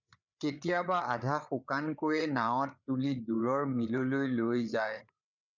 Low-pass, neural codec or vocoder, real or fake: 7.2 kHz; codec, 16 kHz, 8 kbps, FreqCodec, larger model; fake